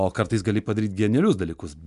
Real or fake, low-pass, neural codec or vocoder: real; 10.8 kHz; none